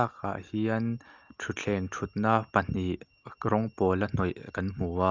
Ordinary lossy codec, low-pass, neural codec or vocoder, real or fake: Opus, 32 kbps; 7.2 kHz; none; real